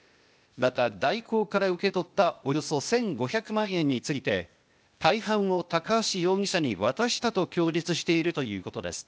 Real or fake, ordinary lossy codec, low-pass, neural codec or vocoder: fake; none; none; codec, 16 kHz, 0.8 kbps, ZipCodec